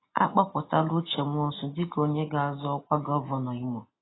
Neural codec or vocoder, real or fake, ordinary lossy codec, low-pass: none; real; AAC, 16 kbps; 7.2 kHz